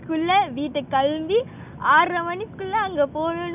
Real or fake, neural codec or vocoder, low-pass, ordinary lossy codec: real; none; 3.6 kHz; none